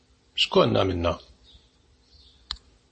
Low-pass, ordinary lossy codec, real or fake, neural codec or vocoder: 10.8 kHz; MP3, 32 kbps; real; none